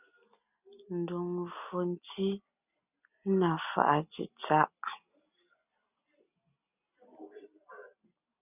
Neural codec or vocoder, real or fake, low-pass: none; real; 3.6 kHz